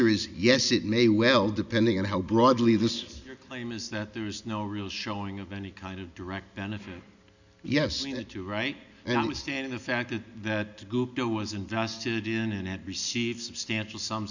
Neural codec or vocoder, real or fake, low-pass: none; real; 7.2 kHz